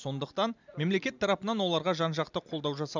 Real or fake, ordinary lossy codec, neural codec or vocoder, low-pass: real; none; none; 7.2 kHz